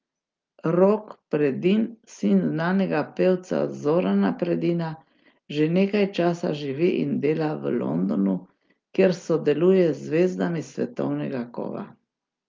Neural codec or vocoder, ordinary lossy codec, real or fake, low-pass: none; Opus, 24 kbps; real; 7.2 kHz